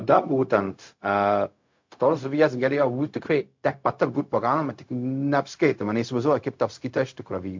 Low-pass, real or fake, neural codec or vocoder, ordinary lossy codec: 7.2 kHz; fake; codec, 16 kHz, 0.4 kbps, LongCat-Audio-Codec; MP3, 48 kbps